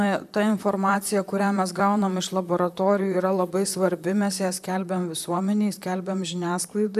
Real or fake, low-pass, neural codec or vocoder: fake; 14.4 kHz; vocoder, 44.1 kHz, 128 mel bands, Pupu-Vocoder